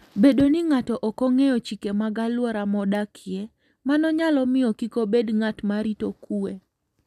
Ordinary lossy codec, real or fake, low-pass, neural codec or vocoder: none; real; 14.4 kHz; none